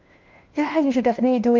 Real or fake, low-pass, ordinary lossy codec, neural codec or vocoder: fake; 7.2 kHz; Opus, 32 kbps; codec, 16 kHz, 1 kbps, FunCodec, trained on LibriTTS, 50 frames a second